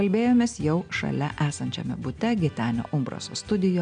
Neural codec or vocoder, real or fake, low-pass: none; real; 9.9 kHz